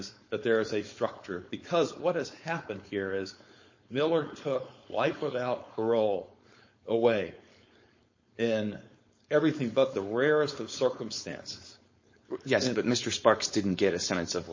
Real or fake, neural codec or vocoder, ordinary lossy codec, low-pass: fake; codec, 16 kHz, 4.8 kbps, FACodec; MP3, 32 kbps; 7.2 kHz